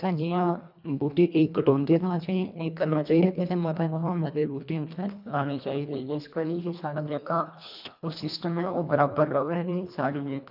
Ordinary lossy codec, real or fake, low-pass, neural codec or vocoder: none; fake; 5.4 kHz; codec, 24 kHz, 1.5 kbps, HILCodec